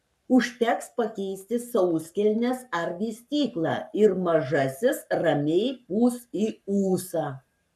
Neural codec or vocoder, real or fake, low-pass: codec, 44.1 kHz, 7.8 kbps, Pupu-Codec; fake; 14.4 kHz